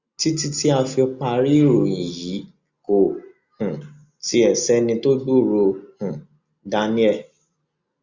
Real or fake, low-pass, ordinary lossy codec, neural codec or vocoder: real; 7.2 kHz; Opus, 64 kbps; none